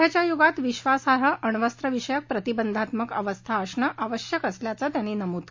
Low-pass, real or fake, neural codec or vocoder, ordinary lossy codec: 7.2 kHz; real; none; MP3, 48 kbps